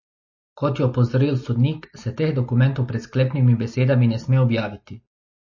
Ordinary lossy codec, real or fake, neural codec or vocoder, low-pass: MP3, 32 kbps; real; none; 7.2 kHz